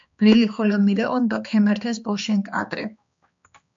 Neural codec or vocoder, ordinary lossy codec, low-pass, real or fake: codec, 16 kHz, 2 kbps, X-Codec, HuBERT features, trained on balanced general audio; AAC, 64 kbps; 7.2 kHz; fake